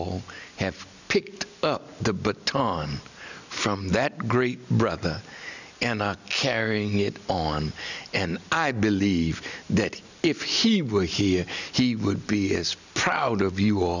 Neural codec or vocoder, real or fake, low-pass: none; real; 7.2 kHz